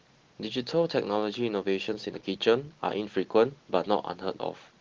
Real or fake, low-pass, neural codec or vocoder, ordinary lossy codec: real; 7.2 kHz; none; Opus, 16 kbps